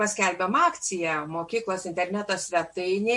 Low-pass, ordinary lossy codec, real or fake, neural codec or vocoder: 10.8 kHz; MP3, 48 kbps; real; none